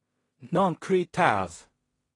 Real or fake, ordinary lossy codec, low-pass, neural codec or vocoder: fake; AAC, 32 kbps; 10.8 kHz; codec, 16 kHz in and 24 kHz out, 0.4 kbps, LongCat-Audio-Codec, two codebook decoder